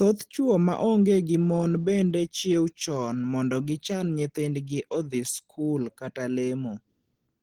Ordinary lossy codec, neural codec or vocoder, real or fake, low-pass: Opus, 16 kbps; none; real; 19.8 kHz